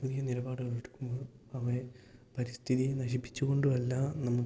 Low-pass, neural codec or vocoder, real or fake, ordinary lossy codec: none; none; real; none